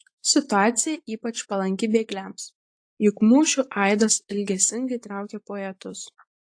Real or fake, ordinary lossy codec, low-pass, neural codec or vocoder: fake; AAC, 48 kbps; 9.9 kHz; vocoder, 24 kHz, 100 mel bands, Vocos